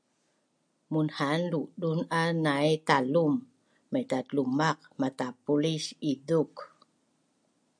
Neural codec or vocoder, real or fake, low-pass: none; real; 9.9 kHz